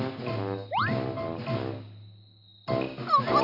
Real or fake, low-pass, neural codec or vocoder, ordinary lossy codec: real; 5.4 kHz; none; Opus, 64 kbps